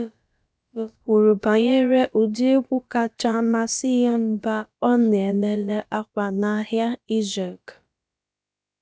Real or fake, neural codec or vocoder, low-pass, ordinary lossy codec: fake; codec, 16 kHz, about 1 kbps, DyCAST, with the encoder's durations; none; none